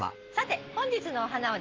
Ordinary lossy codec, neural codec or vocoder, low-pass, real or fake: Opus, 16 kbps; none; 7.2 kHz; real